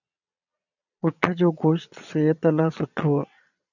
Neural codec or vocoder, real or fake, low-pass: vocoder, 22.05 kHz, 80 mel bands, Vocos; fake; 7.2 kHz